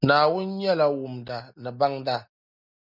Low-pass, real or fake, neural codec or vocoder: 5.4 kHz; real; none